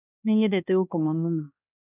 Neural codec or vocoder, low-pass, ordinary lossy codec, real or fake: codec, 16 kHz, 1 kbps, X-Codec, HuBERT features, trained on balanced general audio; 3.6 kHz; none; fake